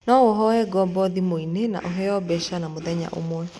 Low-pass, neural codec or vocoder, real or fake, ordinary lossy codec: none; none; real; none